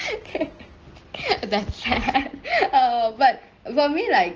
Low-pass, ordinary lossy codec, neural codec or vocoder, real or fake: 7.2 kHz; Opus, 16 kbps; none; real